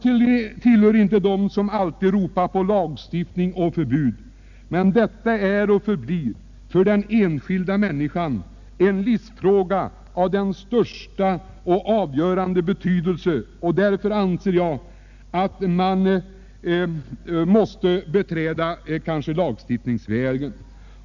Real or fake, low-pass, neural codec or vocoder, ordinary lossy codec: real; 7.2 kHz; none; none